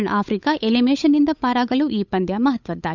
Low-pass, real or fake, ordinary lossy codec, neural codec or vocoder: 7.2 kHz; fake; none; codec, 16 kHz, 8 kbps, FunCodec, trained on Chinese and English, 25 frames a second